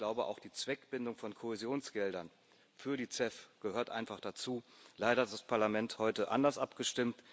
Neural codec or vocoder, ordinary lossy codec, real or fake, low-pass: none; none; real; none